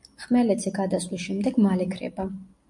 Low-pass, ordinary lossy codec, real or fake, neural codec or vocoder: 10.8 kHz; MP3, 64 kbps; real; none